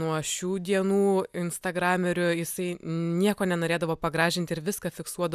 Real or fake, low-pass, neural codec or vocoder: real; 14.4 kHz; none